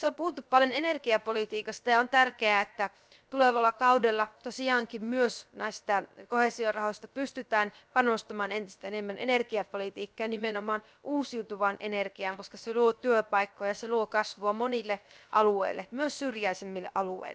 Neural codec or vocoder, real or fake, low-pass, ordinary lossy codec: codec, 16 kHz, 0.7 kbps, FocalCodec; fake; none; none